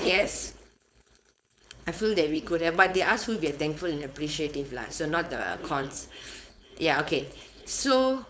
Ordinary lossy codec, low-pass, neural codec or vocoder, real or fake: none; none; codec, 16 kHz, 4.8 kbps, FACodec; fake